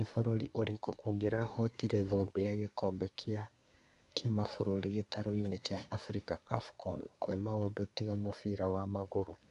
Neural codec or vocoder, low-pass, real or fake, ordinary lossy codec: codec, 24 kHz, 1 kbps, SNAC; 10.8 kHz; fake; none